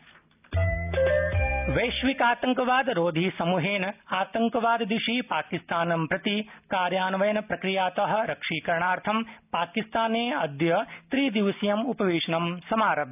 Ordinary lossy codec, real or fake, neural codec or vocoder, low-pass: none; real; none; 3.6 kHz